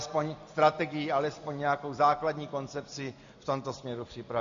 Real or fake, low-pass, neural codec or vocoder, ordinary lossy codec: real; 7.2 kHz; none; AAC, 64 kbps